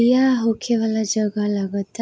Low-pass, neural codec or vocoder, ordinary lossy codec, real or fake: none; none; none; real